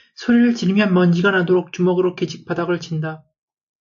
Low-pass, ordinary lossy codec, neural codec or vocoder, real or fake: 7.2 kHz; AAC, 64 kbps; none; real